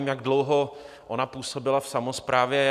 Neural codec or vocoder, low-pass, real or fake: none; 14.4 kHz; real